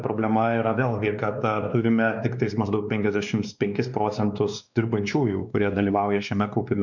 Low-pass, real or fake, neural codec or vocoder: 7.2 kHz; fake; codec, 16 kHz, 2 kbps, X-Codec, WavLM features, trained on Multilingual LibriSpeech